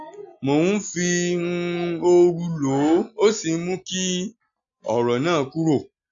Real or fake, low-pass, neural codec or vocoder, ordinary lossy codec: real; 7.2 kHz; none; AAC, 64 kbps